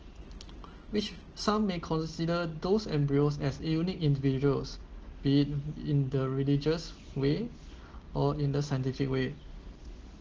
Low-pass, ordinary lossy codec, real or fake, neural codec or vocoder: 7.2 kHz; Opus, 16 kbps; real; none